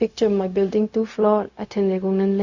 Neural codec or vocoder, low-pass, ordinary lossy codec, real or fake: codec, 16 kHz, 0.4 kbps, LongCat-Audio-Codec; 7.2 kHz; Opus, 64 kbps; fake